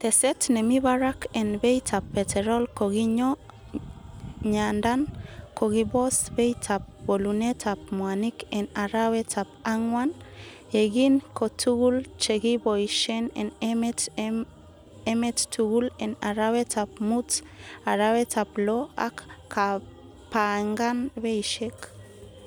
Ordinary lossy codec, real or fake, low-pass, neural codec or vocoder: none; real; none; none